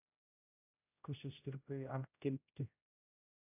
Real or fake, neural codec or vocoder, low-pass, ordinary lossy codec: fake; codec, 16 kHz, 0.5 kbps, X-Codec, HuBERT features, trained on general audio; 3.6 kHz; AAC, 32 kbps